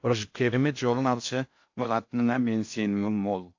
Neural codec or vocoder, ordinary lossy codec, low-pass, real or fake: codec, 16 kHz in and 24 kHz out, 0.6 kbps, FocalCodec, streaming, 4096 codes; AAC, 48 kbps; 7.2 kHz; fake